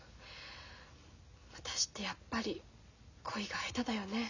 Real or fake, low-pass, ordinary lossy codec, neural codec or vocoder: real; 7.2 kHz; none; none